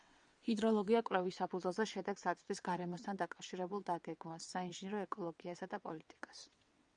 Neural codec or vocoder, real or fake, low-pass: vocoder, 22.05 kHz, 80 mel bands, WaveNeXt; fake; 9.9 kHz